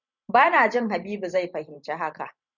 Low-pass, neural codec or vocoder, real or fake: 7.2 kHz; vocoder, 44.1 kHz, 128 mel bands every 512 samples, BigVGAN v2; fake